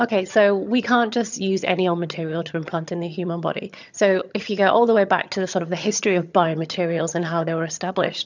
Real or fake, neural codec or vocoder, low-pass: fake; vocoder, 22.05 kHz, 80 mel bands, HiFi-GAN; 7.2 kHz